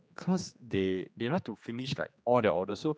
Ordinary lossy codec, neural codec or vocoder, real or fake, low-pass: none; codec, 16 kHz, 2 kbps, X-Codec, HuBERT features, trained on general audio; fake; none